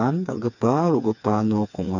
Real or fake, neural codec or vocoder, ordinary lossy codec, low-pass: fake; codec, 16 kHz, 4 kbps, FreqCodec, smaller model; none; 7.2 kHz